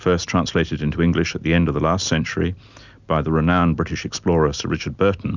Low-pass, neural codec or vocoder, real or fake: 7.2 kHz; none; real